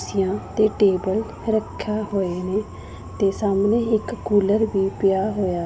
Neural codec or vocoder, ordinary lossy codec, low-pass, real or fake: none; none; none; real